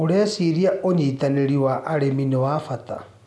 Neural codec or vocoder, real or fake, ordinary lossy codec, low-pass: none; real; none; none